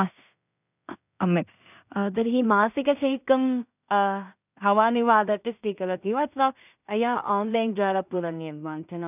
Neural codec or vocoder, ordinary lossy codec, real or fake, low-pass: codec, 16 kHz in and 24 kHz out, 0.4 kbps, LongCat-Audio-Codec, two codebook decoder; none; fake; 3.6 kHz